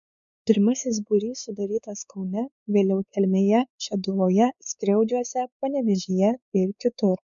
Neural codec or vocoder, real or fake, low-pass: codec, 16 kHz, 4 kbps, X-Codec, WavLM features, trained on Multilingual LibriSpeech; fake; 7.2 kHz